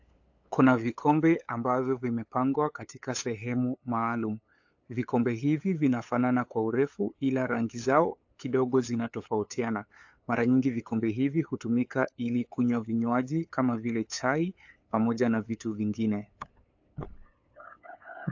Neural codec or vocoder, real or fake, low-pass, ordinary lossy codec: codec, 16 kHz, 8 kbps, FunCodec, trained on LibriTTS, 25 frames a second; fake; 7.2 kHz; AAC, 48 kbps